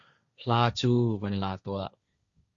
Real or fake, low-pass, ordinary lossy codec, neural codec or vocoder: fake; 7.2 kHz; Opus, 64 kbps; codec, 16 kHz, 1.1 kbps, Voila-Tokenizer